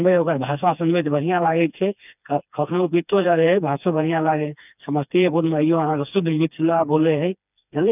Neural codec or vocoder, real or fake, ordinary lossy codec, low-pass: codec, 16 kHz, 2 kbps, FreqCodec, smaller model; fake; none; 3.6 kHz